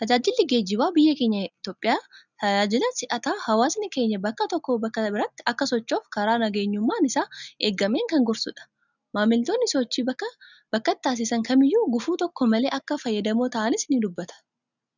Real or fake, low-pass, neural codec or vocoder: real; 7.2 kHz; none